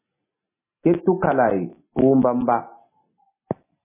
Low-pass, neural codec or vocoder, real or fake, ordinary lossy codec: 3.6 kHz; none; real; MP3, 16 kbps